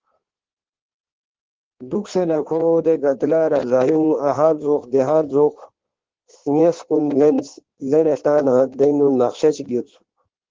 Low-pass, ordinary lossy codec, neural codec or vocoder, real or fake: 7.2 kHz; Opus, 16 kbps; codec, 16 kHz in and 24 kHz out, 1.1 kbps, FireRedTTS-2 codec; fake